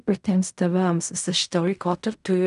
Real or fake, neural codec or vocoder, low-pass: fake; codec, 16 kHz in and 24 kHz out, 0.4 kbps, LongCat-Audio-Codec, fine tuned four codebook decoder; 10.8 kHz